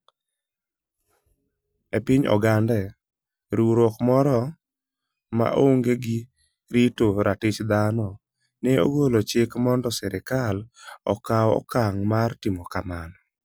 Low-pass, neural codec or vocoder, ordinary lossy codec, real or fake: none; none; none; real